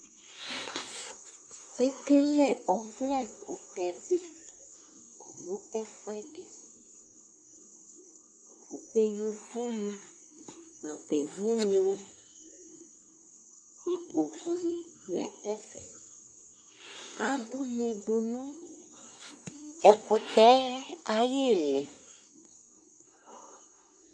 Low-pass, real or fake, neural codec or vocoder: 9.9 kHz; fake; codec, 24 kHz, 1 kbps, SNAC